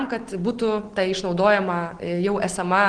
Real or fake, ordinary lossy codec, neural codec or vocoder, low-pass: real; Opus, 16 kbps; none; 9.9 kHz